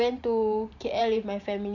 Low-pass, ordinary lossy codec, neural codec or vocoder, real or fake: 7.2 kHz; none; vocoder, 44.1 kHz, 128 mel bands every 512 samples, BigVGAN v2; fake